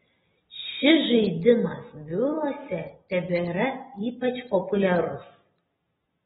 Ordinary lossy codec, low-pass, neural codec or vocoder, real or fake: AAC, 16 kbps; 19.8 kHz; none; real